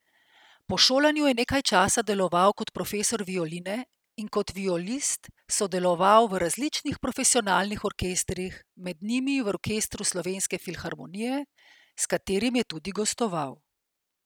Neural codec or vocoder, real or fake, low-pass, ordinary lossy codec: none; real; none; none